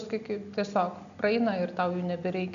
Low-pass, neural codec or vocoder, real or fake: 7.2 kHz; none; real